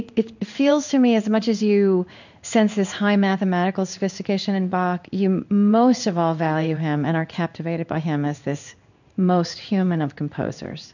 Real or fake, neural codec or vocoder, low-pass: fake; codec, 16 kHz in and 24 kHz out, 1 kbps, XY-Tokenizer; 7.2 kHz